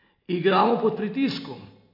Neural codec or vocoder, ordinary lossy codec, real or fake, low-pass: none; MP3, 32 kbps; real; 5.4 kHz